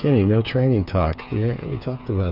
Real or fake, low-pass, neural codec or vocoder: fake; 5.4 kHz; codec, 16 kHz, 8 kbps, FreqCodec, smaller model